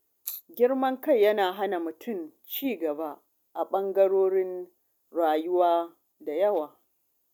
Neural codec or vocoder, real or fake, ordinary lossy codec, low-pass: none; real; none; 19.8 kHz